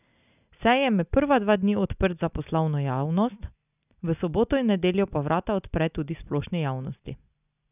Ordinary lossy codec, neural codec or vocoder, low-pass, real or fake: none; none; 3.6 kHz; real